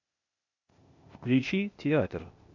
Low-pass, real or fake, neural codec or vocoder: 7.2 kHz; fake; codec, 16 kHz, 0.8 kbps, ZipCodec